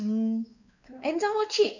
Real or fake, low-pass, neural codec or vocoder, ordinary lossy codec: fake; 7.2 kHz; codec, 16 kHz, 4 kbps, X-Codec, WavLM features, trained on Multilingual LibriSpeech; none